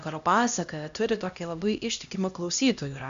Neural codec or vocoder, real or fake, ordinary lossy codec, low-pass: codec, 16 kHz, 1 kbps, X-Codec, HuBERT features, trained on LibriSpeech; fake; Opus, 64 kbps; 7.2 kHz